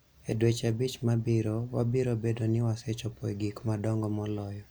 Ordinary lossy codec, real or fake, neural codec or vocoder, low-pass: none; real; none; none